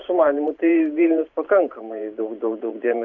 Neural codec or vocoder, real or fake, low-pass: vocoder, 44.1 kHz, 128 mel bands every 512 samples, BigVGAN v2; fake; 7.2 kHz